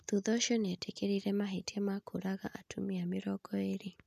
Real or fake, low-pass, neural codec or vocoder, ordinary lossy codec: real; 14.4 kHz; none; none